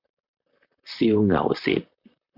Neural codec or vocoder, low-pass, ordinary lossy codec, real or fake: vocoder, 44.1 kHz, 128 mel bands, Pupu-Vocoder; 5.4 kHz; MP3, 48 kbps; fake